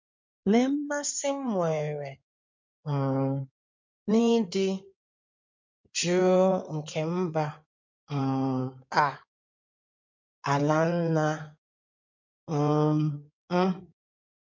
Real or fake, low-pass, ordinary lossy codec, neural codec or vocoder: fake; 7.2 kHz; MP3, 48 kbps; codec, 16 kHz in and 24 kHz out, 2.2 kbps, FireRedTTS-2 codec